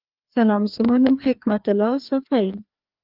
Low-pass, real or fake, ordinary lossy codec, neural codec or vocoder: 5.4 kHz; fake; Opus, 32 kbps; codec, 16 kHz, 2 kbps, FreqCodec, larger model